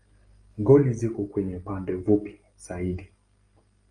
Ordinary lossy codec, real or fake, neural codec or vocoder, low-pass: Opus, 32 kbps; real; none; 9.9 kHz